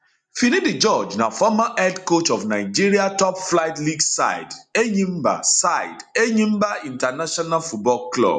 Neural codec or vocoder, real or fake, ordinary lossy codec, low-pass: none; real; none; 9.9 kHz